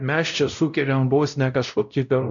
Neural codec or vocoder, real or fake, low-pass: codec, 16 kHz, 0.5 kbps, X-Codec, WavLM features, trained on Multilingual LibriSpeech; fake; 7.2 kHz